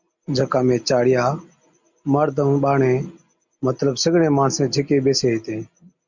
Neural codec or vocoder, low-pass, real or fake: none; 7.2 kHz; real